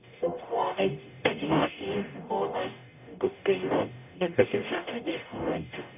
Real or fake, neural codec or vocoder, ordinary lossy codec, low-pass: fake; codec, 44.1 kHz, 0.9 kbps, DAC; none; 3.6 kHz